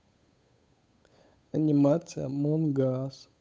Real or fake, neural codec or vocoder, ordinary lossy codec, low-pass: fake; codec, 16 kHz, 8 kbps, FunCodec, trained on Chinese and English, 25 frames a second; none; none